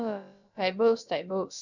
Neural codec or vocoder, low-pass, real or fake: codec, 16 kHz, about 1 kbps, DyCAST, with the encoder's durations; 7.2 kHz; fake